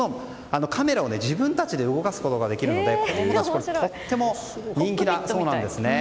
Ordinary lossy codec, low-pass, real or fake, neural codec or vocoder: none; none; real; none